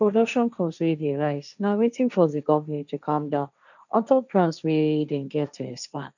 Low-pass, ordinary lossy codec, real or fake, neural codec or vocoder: 7.2 kHz; none; fake; codec, 16 kHz, 1.1 kbps, Voila-Tokenizer